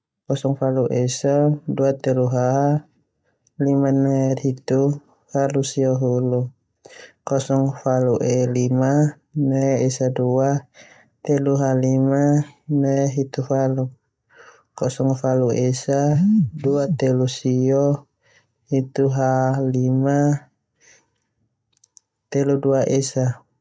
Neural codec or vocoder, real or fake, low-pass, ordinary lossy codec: none; real; none; none